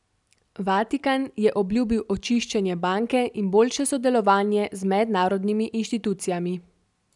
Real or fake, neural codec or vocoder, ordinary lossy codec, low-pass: real; none; none; 10.8 kHz